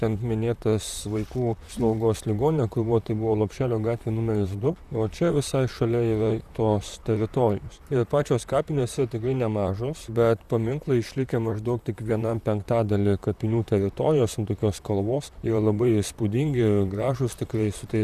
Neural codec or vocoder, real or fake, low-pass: vocoder, 44.1 kHz, 128 mel bands, Pupu-Vocoder; fake; 14.4 kHz